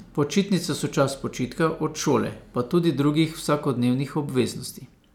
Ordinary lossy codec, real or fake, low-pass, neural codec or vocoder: none; real; 19.8 kHz; none